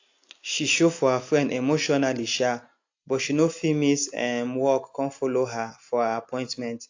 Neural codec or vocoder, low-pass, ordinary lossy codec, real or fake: none; 7.2 kHz; AAC, 48 kbps; real